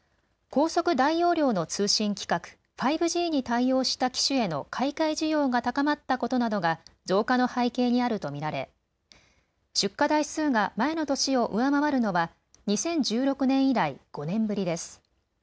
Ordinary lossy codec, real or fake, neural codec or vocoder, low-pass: none; real; none; none